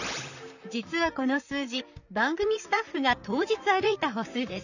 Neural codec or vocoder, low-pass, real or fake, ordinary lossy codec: vocoder, 44.1 kHz, 128 mel bands, Pupu-Vocoder; 7.2 kHz; fake; none